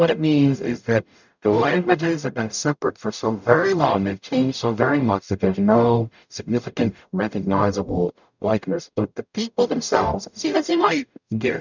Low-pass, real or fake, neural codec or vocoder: 7.2 kHz; fake; codec, 44.1 kHz, 0.9 kbps, DAC